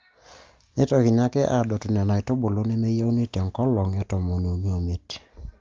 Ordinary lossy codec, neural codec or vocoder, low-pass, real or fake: Opus, 32 kbps; none; 7.2 kHz; real